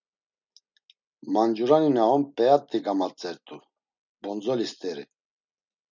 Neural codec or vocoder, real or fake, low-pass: none; real; 7.2 kHz